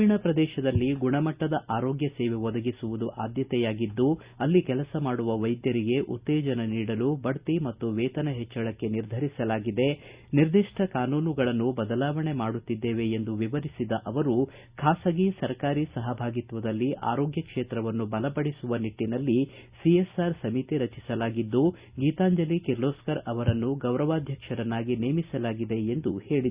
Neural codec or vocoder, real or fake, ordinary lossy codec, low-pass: none; real; Opus, 64 kbps; 3.6 kHz